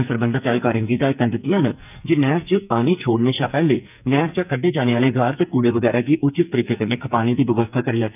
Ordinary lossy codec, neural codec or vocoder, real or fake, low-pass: none; codec, 44.1 kHz, 2.6 kbps, SNAC; fake; 3.6 kHz